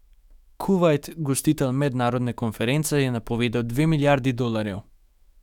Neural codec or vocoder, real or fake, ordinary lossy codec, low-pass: autoencoder, 48 kHz, 128 numbers a frame, DAC-VAE, trained on Japanese speech; fake; none; 19.8 kHz